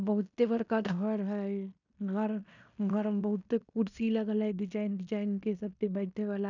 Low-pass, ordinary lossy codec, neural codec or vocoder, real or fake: 7.2 kHz; none; codec, 16 kHz in and 24 kHz out, 0.9 kbps, LongCat-Audio-Codec, fine tuned four codebook decoder; fake